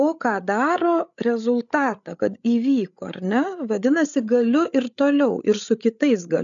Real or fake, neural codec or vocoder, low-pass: fake; codec, 16 kHz, 8 kbps, FreqCodec, larger model; 7.2 kHz